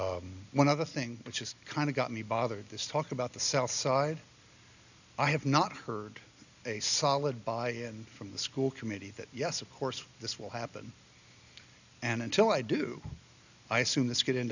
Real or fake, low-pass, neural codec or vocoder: real; 7.2 kHz; none